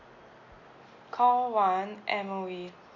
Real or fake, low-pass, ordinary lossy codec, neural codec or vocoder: real; 7.2 kHz; none; none